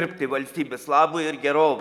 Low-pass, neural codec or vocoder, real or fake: 19.8 kHz; none; real